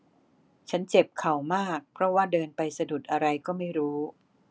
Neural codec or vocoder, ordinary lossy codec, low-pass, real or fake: none; none; none; real